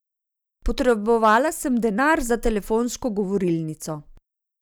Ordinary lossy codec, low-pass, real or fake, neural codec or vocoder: none; none; real; none